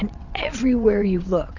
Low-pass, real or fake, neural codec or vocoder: 7.2 kHz; fake; vocoder, 22.05 kHz, 80 mel bands, WaveNeXt